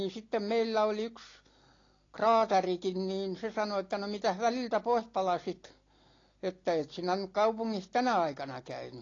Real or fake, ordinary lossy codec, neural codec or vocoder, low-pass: real; AAC, 32 kbps; none; 7.2 kHz